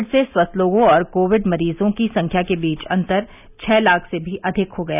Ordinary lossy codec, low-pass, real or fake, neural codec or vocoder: none; 3.6 kHz; real; none